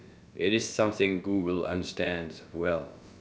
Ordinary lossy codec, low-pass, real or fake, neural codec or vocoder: none; none; fake; codec, 16 kHz, about 1 kbps, DyCAST, with the encoder's durations